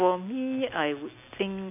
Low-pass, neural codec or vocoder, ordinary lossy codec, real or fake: 3.6 kHz; none; none; real